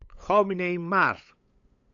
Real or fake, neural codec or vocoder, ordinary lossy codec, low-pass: fake; codec, 16 kHz, 8 kbps, FunCodec, trained on LibriTTS, 25 frames a second; MP3, 64 kbps; 7.2 kHz